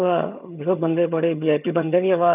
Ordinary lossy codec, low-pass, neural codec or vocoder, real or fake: none; 3.6 kHz; vocoder, 22.05 kHz, 80 mel bands, HiFi-GAN; fake